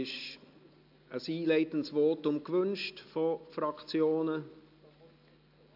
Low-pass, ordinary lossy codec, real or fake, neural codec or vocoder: 5.4 kHz; AAC, 48 kbps; real; none